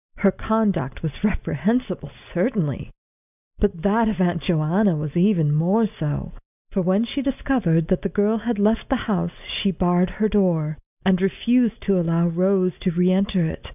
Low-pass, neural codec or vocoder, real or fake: 3.6 kHz; none; real